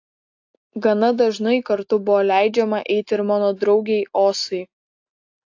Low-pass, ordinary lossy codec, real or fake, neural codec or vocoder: 7.2 kHz; AAC, 48 kbps; real; none